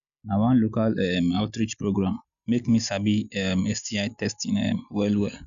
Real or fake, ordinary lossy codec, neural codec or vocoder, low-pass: real; none; none; 7.2 kHz